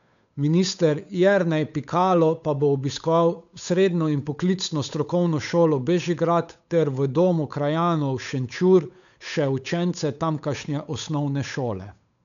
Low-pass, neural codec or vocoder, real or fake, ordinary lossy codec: 7.2 kHz; codec, 16 kHz, 8 kbps, FunCodec, trained on Chinese and English, 25 frames a second; fake; none